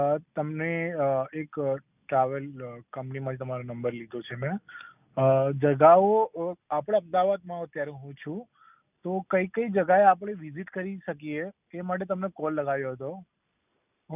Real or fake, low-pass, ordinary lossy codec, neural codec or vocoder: real; 3.6 kHz; none; none